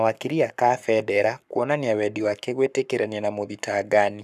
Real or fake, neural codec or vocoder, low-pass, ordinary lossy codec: fake; codec, 44.1 kHz, 7.8 kbps, Pupu-Codec; 14.4 kHz; none